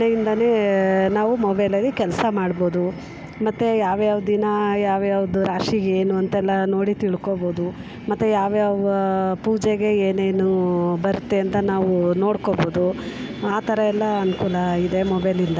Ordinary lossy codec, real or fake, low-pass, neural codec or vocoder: none; real; none; none